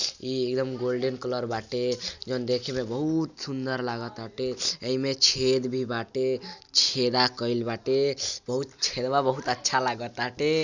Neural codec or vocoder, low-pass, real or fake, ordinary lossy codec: none; 7.2 kHz; real; none